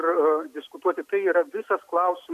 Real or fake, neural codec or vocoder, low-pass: real; none; 14.4 kHz